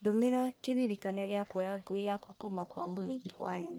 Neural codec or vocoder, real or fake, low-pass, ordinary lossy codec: codec, 44.1 kHz, 1.7 kbps, Pupu-Codec; fake; none; none